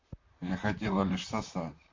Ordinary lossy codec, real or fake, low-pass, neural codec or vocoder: MP3, 48 kbps; fake; 7.2 kHz; vocoder, 44.1 kHz, 128 mel bands, Pupu-Vocoder